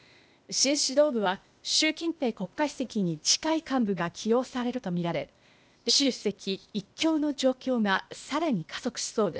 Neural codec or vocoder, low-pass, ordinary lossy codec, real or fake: codec, 16 kHz, 0.8 kbps, ZipCodec; none; none; fake